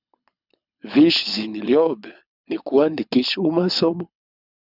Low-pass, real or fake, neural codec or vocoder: 5.4 kHz; fake; codec, 24 kHz, 6 kbps, HILCodec